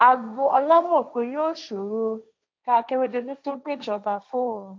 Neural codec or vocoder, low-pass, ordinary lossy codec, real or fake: codec, 16 kHz, 1.1 kbps, Voila-Tokenizer; none; none; fake